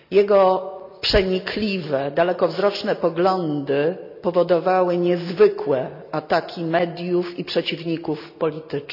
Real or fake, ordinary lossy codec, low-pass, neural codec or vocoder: real; none; 5.4 kHz; none